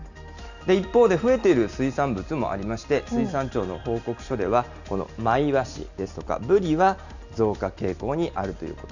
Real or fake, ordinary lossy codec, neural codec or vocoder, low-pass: real; none; none; 7.2 kHz